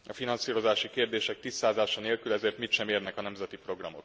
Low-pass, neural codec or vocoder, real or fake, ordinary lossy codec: none; none; real; none